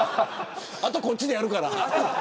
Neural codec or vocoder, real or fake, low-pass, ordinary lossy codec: none; real; none; none